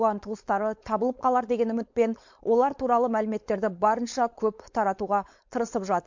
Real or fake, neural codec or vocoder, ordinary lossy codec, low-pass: fake; codec, 16 kHz, 4.8 kbps, FACodec; MP3, 48 kbps; 7.2 kHz